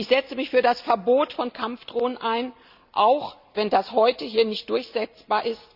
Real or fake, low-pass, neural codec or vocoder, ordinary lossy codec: real; 5.4 kHz; none; Opus, 64 kbps